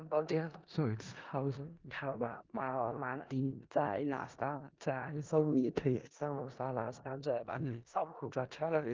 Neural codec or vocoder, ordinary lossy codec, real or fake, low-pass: codec, 16 kHz in and 24 kHz out, 0.4 kbps, LongCat-Audio-Codec, four codebook decoder; Opus, 32 kbps; fake; 7.2 kHz